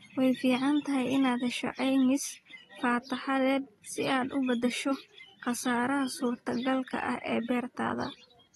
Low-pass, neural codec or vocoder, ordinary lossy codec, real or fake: 19.8 kHz; none; AAC, 32 kbps; real